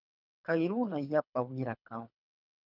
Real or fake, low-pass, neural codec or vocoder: fake; 5.4 kHz; codec, 24 kHz, 3 kbps, HILCodec